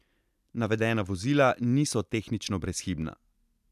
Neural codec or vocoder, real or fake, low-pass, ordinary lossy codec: none; real; 14.4 kHz; none